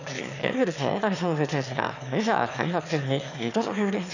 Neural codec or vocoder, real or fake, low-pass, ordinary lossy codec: autoencoder, 22.05 kHz, a latent of 192 numbers a frame, VITS, trained on one speaker; fake; 7.2 kHz; none